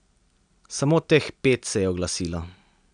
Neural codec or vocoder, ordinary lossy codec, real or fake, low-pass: none; none; real; 9.9 kHz